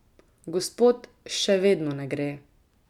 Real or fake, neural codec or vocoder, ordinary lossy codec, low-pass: real; none; none; 19.8 kHz